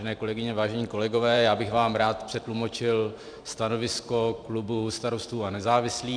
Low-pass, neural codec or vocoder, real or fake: 9.9 kHz; vocoder, 44.1 kHz, 128 mel bands every 512 samples, BigVGAN v2; fake